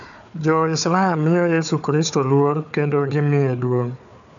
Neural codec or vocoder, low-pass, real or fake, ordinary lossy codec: codec, 16 kHz, 4 kbps, FunCodec, trained on Chinese and English, 50 frames a second; 7.2 kHz; fake; none